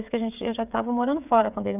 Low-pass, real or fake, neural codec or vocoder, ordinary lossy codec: 3.6 kHz; fake; codec, 16 kHz, 8 kbps, FreqCodec, smaller model; none